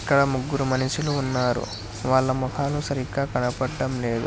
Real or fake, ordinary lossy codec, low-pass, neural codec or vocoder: real; none; none; none